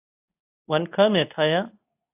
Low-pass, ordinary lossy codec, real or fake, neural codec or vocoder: 3.6 kHz; AAC, 32 kbps; fake; codec, 24 kHz, 0.9 kbps, WavTokenizer, medium speech release version 2